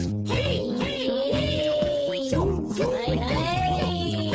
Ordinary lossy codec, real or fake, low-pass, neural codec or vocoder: none; fake; none; codec, 16 kHz, 8 kbps, FreqCodec, smaller model